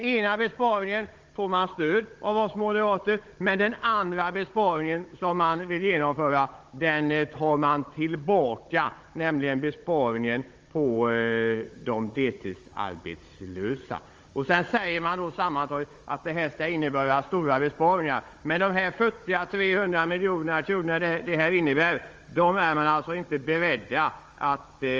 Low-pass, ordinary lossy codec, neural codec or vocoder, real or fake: 7.2 kHz; Opus, 32 kbps; codec, 16 kHz, 16 kbps, FunCodec, trained on Chinese and English, 50 frames a second; fake